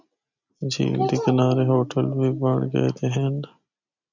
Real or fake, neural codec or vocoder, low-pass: real; none; 7.2 kHz